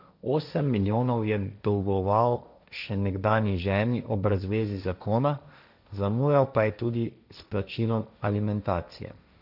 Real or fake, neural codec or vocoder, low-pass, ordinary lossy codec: fake; codec, 16 kHz, 1.1 kbps, Voila-Tokenizer; 5.4 kHz; none